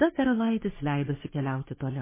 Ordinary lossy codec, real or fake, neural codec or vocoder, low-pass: MP3, 16 kbps; fake; codec, 44.1 kHz, 3.4 kbps, Pupu-Codec; 3.6 kHz